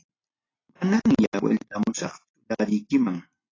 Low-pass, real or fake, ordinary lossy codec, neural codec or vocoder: 7.2 kHz; real; AAC, 32 kbps; none